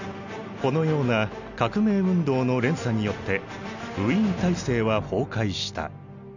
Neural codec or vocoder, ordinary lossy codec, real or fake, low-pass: none; none; real; 7.2 kHz